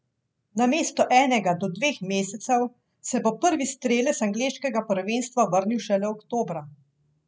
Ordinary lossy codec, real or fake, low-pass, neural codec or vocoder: none; real; none; none